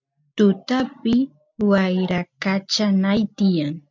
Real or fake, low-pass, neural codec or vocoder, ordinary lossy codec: real; 7.2 kHz; none; MP3, 64 kbps